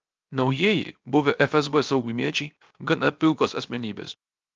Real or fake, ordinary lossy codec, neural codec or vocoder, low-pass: fake; Opus, 24 kbps; codec, 16 kHz, 0.7 kbps, FocalCodec; 7.2 kHz